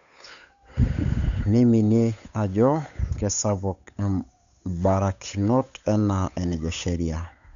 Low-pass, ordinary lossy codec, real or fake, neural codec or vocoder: 7.2 kHz; none; fake; codec, 16 kHz, 8 kbps, FunCodec, trained on Chinese and English, 25 frames a second